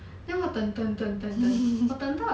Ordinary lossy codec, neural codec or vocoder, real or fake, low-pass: none; none; real; none